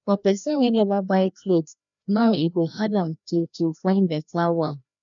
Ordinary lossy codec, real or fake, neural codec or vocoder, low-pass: none; fake; codec, 16 kHz, 1 kbps, FreqCodec, larger model; 7.2 kHz